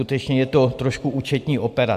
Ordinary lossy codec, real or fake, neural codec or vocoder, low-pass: AAC, 96 kbps; real; none; 14.4 kHz